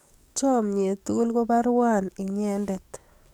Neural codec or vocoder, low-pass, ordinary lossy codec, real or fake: codec, 44.1 kHz, 7.8 kbps, DAC; 19.8 kHz; none; fake